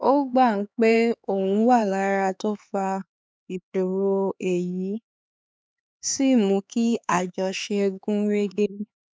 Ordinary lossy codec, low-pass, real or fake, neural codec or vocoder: none; none; fake; codec, 16 kHz, 4 kbps, X-Codec, HuBERT features, trained on LibriSpeech